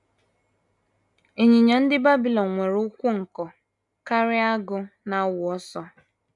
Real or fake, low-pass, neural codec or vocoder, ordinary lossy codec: real; 10.8 kHz; none; none